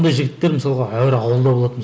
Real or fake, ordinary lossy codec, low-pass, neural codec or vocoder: real; none; none; none